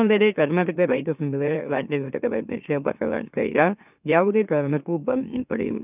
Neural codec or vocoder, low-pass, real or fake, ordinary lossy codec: autoencoder, 44.1 kHz, a latent of 192 numbers a frame, MeloTTS; 3.6 kHz; fake; none